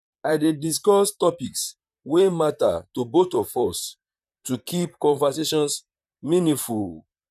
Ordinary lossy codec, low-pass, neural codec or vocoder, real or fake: none; 14.4 kHz; vocoder, 44.1 kHz, 128 mel bands, Pupu-Vocoder; fake